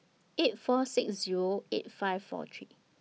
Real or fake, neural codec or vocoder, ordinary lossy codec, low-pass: real; none; none; none